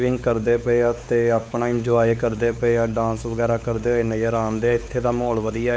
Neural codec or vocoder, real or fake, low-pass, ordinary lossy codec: codec, 16 kHz, 4 kbps, X-Codec, WavLM features, trained on Multilingual LibriSpeech; fake; none; none